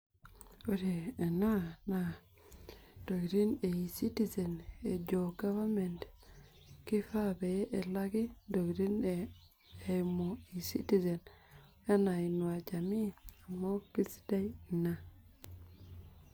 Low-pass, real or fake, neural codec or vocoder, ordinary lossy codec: none; real; none; none